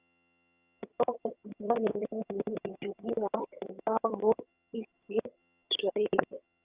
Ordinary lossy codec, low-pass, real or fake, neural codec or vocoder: Opus, 64 kbps; 3.6 kHz; fake; vocoder, 22.05 kHz, 80 mel bands, HiFi-GAN